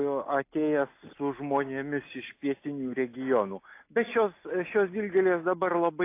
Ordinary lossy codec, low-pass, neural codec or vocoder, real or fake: AAC, 24 kbps; 3.6 kHz; none; real